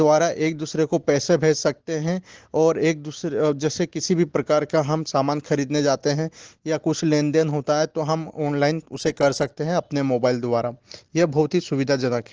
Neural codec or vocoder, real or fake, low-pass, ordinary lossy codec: none; real; 7.2 kHz; Opus, 16 kbps